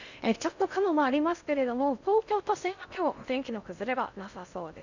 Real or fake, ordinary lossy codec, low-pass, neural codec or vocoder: fake; none; 7.2 kHz; codec, 16 kHz in and 24 kHz out, 0.6 kbps, FocalCodec, streaming, 2048 codes